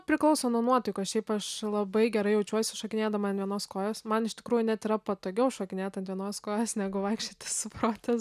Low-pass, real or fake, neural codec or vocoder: 14.4 kHz; real; none